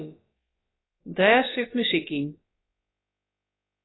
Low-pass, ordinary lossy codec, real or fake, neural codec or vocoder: 7.2 kHz; AAC, 16 kbps; fake; codec, 16 kHz, about 1 kbps, DyCAST, with the encoder's durations